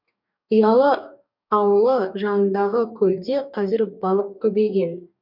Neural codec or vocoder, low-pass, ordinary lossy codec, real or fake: codec, 44.1 kHz, 2.6 kbps, DAC; 5.4 kHz; none; fake